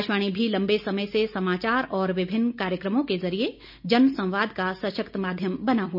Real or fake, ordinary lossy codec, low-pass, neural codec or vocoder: real; none; 5.4 kHz; none